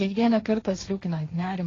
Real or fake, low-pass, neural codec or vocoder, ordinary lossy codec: fake; 7.2 kHz; codec, 16 kHz, 1.1 kbps, Voila-Tokenizer; AAC, 32 kbps